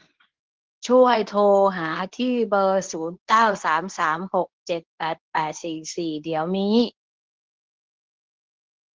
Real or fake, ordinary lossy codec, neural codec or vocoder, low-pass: fake; Opus, 16 kbps; codec, 24 kHz, 0.9 kbps, WavTokenizer, medium speech release version 2; 7.2 kHz